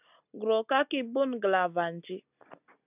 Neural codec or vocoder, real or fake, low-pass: none; real; 3.6 kHz